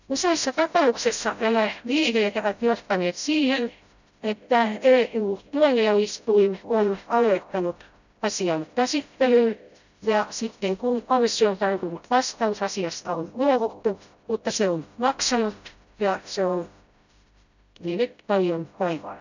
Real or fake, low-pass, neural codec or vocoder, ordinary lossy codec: fake; 7.2 kHz; codec, 16 kHz, 0.5 kbps, FreqCodec, smaller model; none